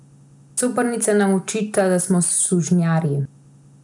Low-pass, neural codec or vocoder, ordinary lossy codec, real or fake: 10.8 kHz; none; none; real